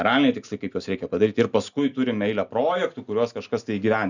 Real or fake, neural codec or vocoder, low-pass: real; none; 7.2 kHz